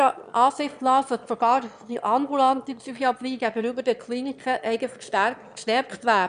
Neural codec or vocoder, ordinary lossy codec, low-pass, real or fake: autoencoder, 22.05 kHz, a latent of 192 numbers a frame, VITS, trained on one speaker; none; 9.9 kHz; fake